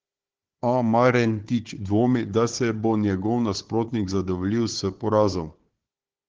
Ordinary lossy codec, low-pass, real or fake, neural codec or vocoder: Opus, 16 kbps; 7.2 kHz; fake; codec, 16 kHz, 4 kbps, FunCodec, trained on Chinese and English, 50 frames a second